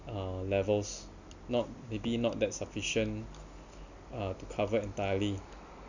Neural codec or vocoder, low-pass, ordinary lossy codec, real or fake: none; 7.2 kHz; none; real